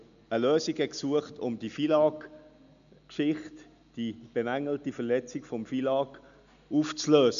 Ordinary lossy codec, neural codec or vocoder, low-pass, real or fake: MP3, 96 kbps; none; 7.2 kHz; real